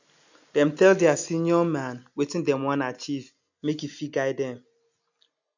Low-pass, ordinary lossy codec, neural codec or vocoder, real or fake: 7.2 kHz; none; none; real